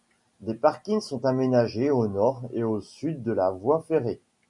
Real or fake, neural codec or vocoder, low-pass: real; none; 10.8 kHz